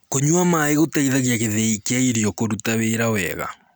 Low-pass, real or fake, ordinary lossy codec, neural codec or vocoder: none; real; none; none